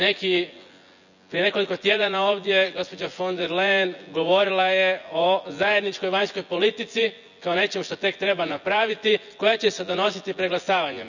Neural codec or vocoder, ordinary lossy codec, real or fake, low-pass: vocoder, 24 kHz, 100 mel bands, Vocos; none; fake; 7.2 kHz